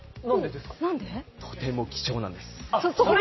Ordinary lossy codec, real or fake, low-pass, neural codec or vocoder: MP3, 24 kbps; real; 7.2 kHz; none